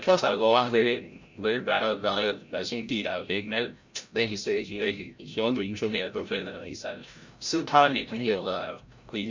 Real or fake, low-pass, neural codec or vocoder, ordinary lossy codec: fake; 7.2 kHz; codec, 16 kHz, 0.5 kbps, FreqCodec, larger model; MP3, 64 kbps